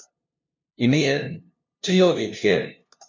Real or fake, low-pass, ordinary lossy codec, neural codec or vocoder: fake; 7.2 kHz; MP3, 48 kbps; codec, 16 kHz, 0.5 kbps, FunCodec, trained on LibriTTS, 25 frames a second